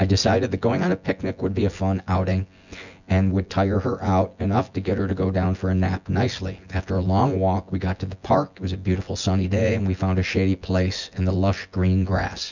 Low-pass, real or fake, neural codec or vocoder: 7.2 kHz; fake; vocoder, 24 kHz, 100 mel bands, Vocos